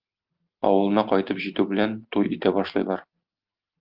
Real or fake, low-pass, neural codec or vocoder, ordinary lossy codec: real; 5.4 kHz; none; Opus, 24 kbps